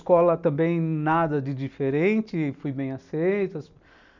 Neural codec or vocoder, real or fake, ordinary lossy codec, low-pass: none; real; none; 7.2 kHz